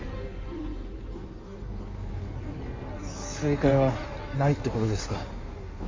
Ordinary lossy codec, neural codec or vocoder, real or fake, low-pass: MP3, 32 kbps; codec, 16 kHz in and 24 kHz out, 1.1 kbps, FireRedTTS-2 codec; fake; 7.2 kHz